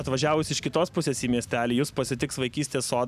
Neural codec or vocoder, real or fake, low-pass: none; real; 14.4 kHz